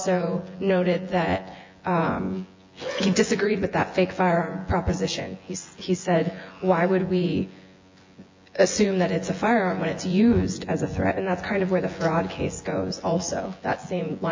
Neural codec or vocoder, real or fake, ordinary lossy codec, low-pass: vocoder, 24 kHz, 100 mel bands, Vocos; fake; MP3, 48 kbps; 7.2 kHz